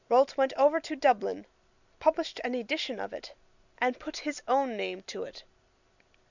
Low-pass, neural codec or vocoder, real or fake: 7.2 kHz; none; real